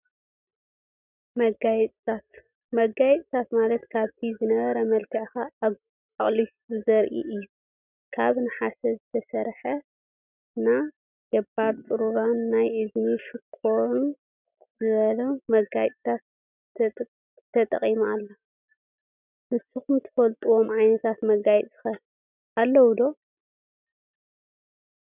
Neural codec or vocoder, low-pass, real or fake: none; 3.6 kHz; real